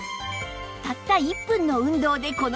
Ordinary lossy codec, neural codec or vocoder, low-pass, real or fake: none; none; none; real